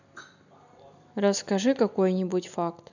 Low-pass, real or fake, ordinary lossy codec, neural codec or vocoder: 7.2 kHz; real; none; none